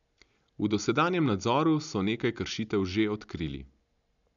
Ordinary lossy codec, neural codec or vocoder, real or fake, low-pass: none; none; real; 7.2 kHz